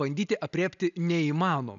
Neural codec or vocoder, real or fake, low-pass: none; real; 7.2 kHz